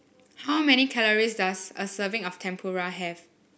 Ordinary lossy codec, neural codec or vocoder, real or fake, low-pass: none; none; real; none